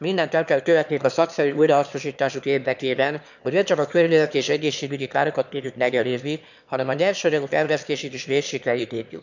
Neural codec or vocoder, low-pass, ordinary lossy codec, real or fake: autoencoder, 22.05 kHz, a latent of 192 numbers a frame, VITS, trained on one speaker; 7.2 kHz; none; fake